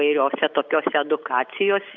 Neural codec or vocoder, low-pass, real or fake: none; 7.2 kHz; real